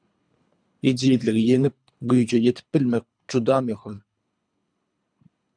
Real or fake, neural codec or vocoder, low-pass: fake; codec, 24 kHz, 3 kbps, HILCodec; 9.9 kHz